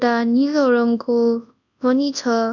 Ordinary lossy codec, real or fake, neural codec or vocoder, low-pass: none; fake; codec, 24 kHz, 0.9 kbps, WavTokenizer, large speech release; 7.2 kHz